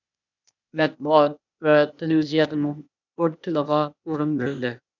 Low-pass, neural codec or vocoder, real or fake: 7.2 kHz; codec, 16 kHz, 0.8 kbps, ZipCodec; fake